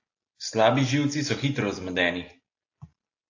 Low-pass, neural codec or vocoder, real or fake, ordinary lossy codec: 7.2 kHz; none; real; AAC, 48 kbps